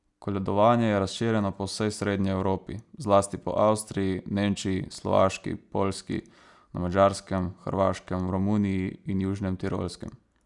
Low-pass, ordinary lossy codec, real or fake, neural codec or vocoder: 10.8 kHz; none; real; none